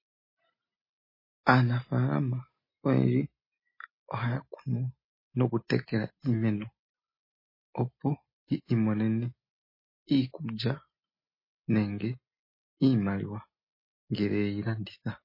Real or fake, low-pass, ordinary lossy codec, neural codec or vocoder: real; 5.4 kHz; MP3, 24 kbps; none